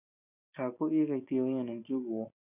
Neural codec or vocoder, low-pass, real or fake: none; 3.6 kHz; real